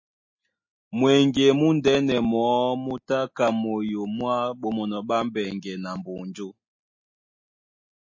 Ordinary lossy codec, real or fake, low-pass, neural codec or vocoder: MP3, 32 kbps; real; 7.2 kHz; none